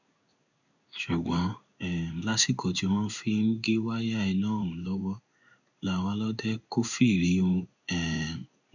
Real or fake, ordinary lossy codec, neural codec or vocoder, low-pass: fake; none; codec, 16 kHz in and 24 kHz out, 1 kbps, XY-Tokenizer; 7.2 kHz